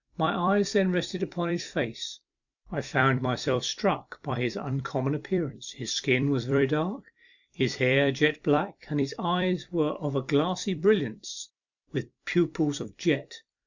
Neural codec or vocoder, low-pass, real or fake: vocoder, 44.1 kHz, 128 mel bands every 256 samples, BigVGAN v2; 7.2 kHz; fake